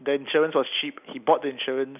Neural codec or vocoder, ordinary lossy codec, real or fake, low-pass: none; none; real; 3.6 kHz